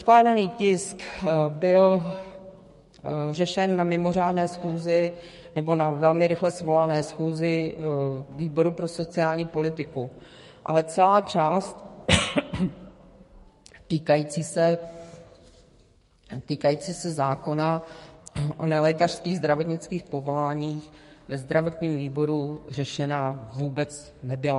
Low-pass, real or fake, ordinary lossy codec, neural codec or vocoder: 14.4 kHz; fake; MP3, 48 kbps; codec, 44.1 kHz, 2.6 kbps, SNAC